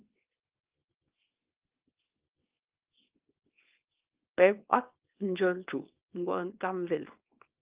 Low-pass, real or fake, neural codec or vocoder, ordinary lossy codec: 3.6 kHz; fake; codec, 24 kHz, 0.9 kbps, WavTokenizer, small release; Opus, 24 kbps